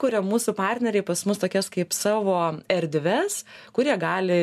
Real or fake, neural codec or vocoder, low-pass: real; none; 14.4 kHz